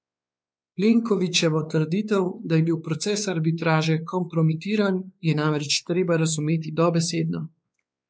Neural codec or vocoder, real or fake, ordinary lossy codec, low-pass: codec, 16 kHz, 4 kbps, X-Codec, WavLM features, trained on Multilingual LibriSpeech; fake; none; none